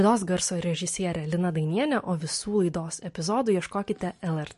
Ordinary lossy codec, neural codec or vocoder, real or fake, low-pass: MP3, 48 kbps; none; real; 14.4 kHz